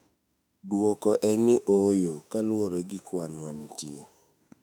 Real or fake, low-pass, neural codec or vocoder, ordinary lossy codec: fake; 19.8 kHz; autoencoder, 48 kHz, 32 numbers a frame, DAC-VAE, trained on Japanese speech; none